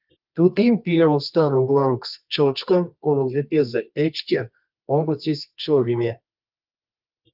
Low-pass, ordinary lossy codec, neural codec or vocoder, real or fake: 5.4 kHz; Opus, 24 kbps; codec, 24 kHz, 0.9 kbps, WavTokenizer, medium music audio release; fake